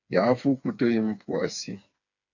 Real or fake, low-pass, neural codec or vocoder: fake; 7.2 kHz; codec, 16 kHz, 4 kbps, FreqCodec, smaller model